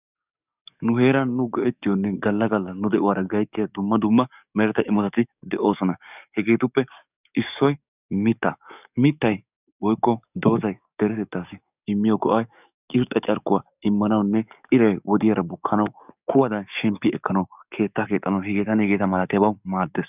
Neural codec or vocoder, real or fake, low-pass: codec, 44.1 kHz, 7.8 kbps, DAC; fake; 3.6 kHz